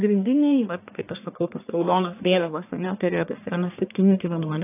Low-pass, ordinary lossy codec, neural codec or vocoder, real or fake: 3.6 kHz; AAC, 24 kbps; codec, 44.1 kHz, 1.7 kbps, Pupu-Codec; fake